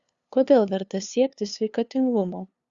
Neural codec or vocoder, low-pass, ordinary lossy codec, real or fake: codec, 16 kHz, 2 kbps, FunCodec, trained on LibriTTS, 25 frames a second; 7.2 kHz; Opus, 64 kbps; fake